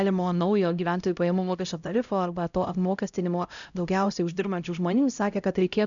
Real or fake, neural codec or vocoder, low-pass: fake; codec, 16 kHz, 0.5 kbps, X-Codec, HuBERT features, trained on LibriSpeech; 7.2 kHz